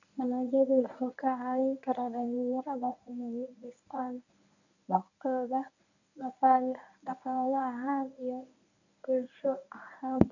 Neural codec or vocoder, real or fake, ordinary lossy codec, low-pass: codec, 24 kHz, 0.9 kbps, WavTokenizer, medium speech release version 1; fake; none; 7.2 kHz